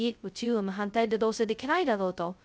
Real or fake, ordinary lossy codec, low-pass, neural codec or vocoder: fake; none; none; codec, 16 kHz, 0.2 kbps, FocalCodec